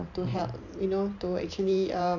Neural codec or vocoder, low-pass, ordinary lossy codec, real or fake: vocoder, 44.1 kHz, 128 mel bands every 512 samples, BigVGAN v2; 7.2 kHz; none; fake